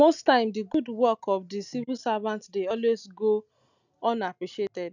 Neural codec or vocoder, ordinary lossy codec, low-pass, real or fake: none; none; 7.2 kHz; real